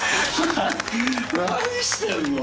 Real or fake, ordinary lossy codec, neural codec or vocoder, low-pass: real; none; none; none